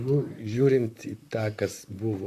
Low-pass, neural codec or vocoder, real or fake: 14.4 kHz; vocoder, 44.1 kHz, 128 mel bands, Pupu-Vocoder; fake